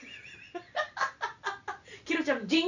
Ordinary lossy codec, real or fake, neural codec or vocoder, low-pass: none; real; none; 7.2 kHz